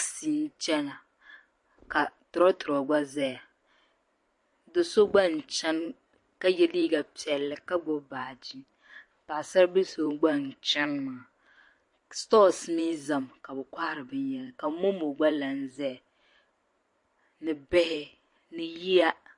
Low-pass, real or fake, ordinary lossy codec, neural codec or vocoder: 10.8 kHz; fake; MP3, 48 kbps; vocoder, 44.1 kHz, 128 mel bands every 512 samples, BigVGAN v2